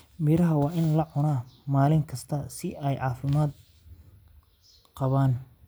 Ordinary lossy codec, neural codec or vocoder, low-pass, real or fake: none; none; none; real